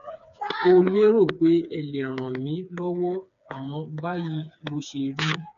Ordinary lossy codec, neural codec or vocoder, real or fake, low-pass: none; codec, 16 kHz, 4 kbps, FreqCodec, smaller model; fake; 7.2 kHz